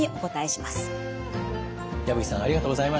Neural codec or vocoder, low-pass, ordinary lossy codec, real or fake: none; none; none; real